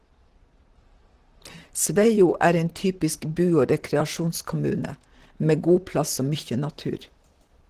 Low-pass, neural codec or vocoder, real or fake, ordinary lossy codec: 14.4 kHz; vocoder, 44.1 kHz, 128 mel bands, Pupu-Vocoder; fake; Opus, 16 kbps